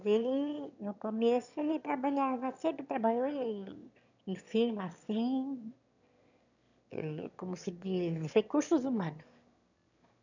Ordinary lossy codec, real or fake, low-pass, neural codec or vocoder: none; fake; 7.2 kHz; autoencoder, 22.05 kHz, a latent of 192 numbers a frame, VITS, trained on one speaker